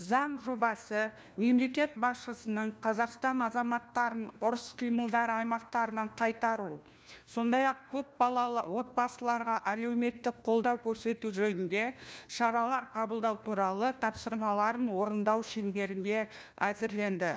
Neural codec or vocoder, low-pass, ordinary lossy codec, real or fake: codec, 16 kHz, 1 kbps, FunCodec, trained on LibriTTS, 50 frames a second; none; none; fake